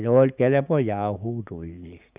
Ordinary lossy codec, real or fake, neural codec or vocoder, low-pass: Opus, 64 kbps; fake; codec, 16 kHz, 6 kbps, DAC; 3.6 kHz